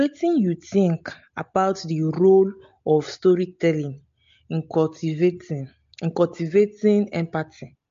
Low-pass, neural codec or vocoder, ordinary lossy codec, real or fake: 7.2 kHz; none; MP3, 48 kbps; real